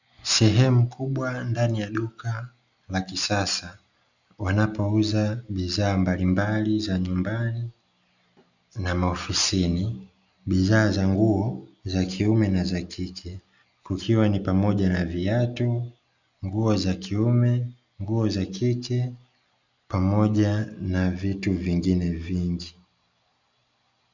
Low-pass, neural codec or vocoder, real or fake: 7.2 kHz; none; real